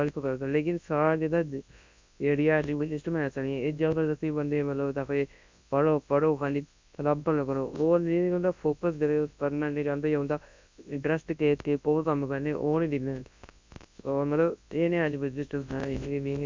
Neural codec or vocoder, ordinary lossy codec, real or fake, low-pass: codec, 24 kHz, 0.9 kbps, WavTokenizer, large speech release; MP3, 64 kbps; fake; 7.2 kHz